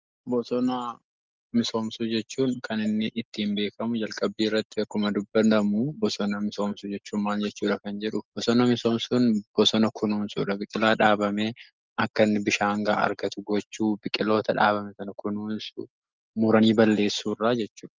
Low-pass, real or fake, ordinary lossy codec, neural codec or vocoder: 7.2 kHz; real; Opus, 32 kbps; none